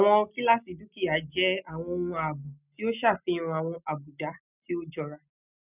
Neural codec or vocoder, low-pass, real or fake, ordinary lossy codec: none; 3.6 kHz; real; none